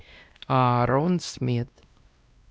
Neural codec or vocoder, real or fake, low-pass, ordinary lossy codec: codec, 16 kHz, 1 kbps, X-Codec, WavLM features, trained on Multilingual LibriSpeech; fake; none; none